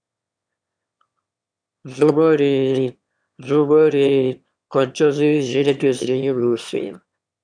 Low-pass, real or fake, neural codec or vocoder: 9.9 kHz; fake; autoencoder, 22.05 kHz, a latent of 192 numbers a frame, VITS, trained on one speaker